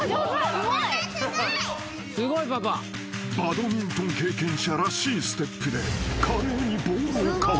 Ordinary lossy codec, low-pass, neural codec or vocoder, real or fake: none; none; none; real